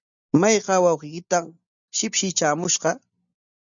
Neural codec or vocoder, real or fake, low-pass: none; real; 7.2 kHz